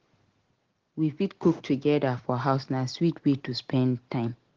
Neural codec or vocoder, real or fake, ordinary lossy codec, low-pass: none; real; Opus, 16 kbps; 7.2 kHz